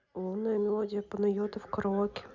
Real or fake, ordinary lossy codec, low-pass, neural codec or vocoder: real; Opus, 64 kbps; 7.2 kHz; none